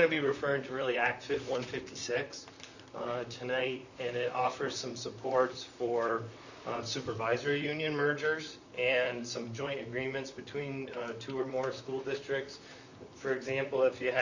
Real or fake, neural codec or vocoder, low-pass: fake; vocoder, 44.1 kHz, 128 mel bands, Pupu-Vocoder; 7.2 kHz